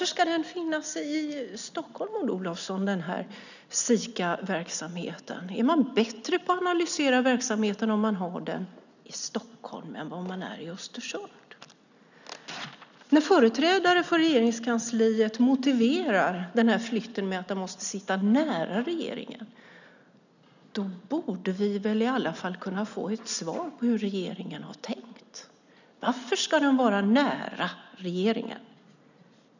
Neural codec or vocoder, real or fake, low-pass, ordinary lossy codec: none; real; 7.2 kHz; none